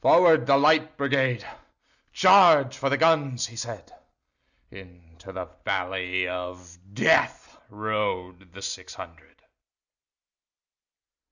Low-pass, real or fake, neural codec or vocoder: 7.2 kHz; real; none